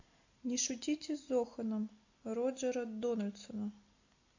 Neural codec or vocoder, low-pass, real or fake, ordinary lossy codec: none; 7.2 kHz; real; AAC, 48 kbps